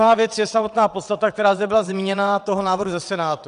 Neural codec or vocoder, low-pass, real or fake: vocoder, 22.05 kHz, 80 mel bands, WaveNeXt; 9.9 kHz; fake